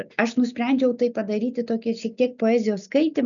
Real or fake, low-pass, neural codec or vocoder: real; 7.2 kHz; none